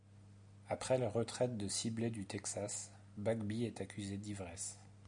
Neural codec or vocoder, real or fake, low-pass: none; real; 9.9 kHz